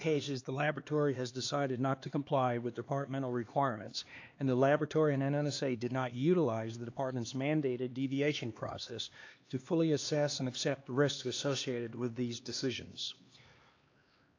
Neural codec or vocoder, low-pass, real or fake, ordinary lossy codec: codec, 16 kHz, 2 kbps, X-Codec, HuBERT features, trained on LibriSpeech; 7.2 kHz; fake; AAC, 48 kbps